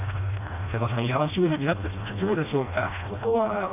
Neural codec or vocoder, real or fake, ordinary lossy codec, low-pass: codec, 16 kHz, 1 kbps, FreqCodec, smaller model; fake; none; 3.6 kHz